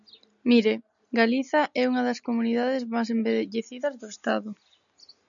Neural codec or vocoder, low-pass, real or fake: none; 7.2 kHz; real